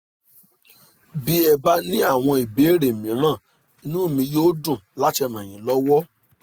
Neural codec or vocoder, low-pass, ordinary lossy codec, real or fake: none; none; none; real